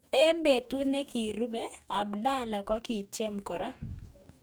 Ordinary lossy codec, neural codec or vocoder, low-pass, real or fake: none; codec, 44.1 kHz, 2.6 kbps, DAC; none; fake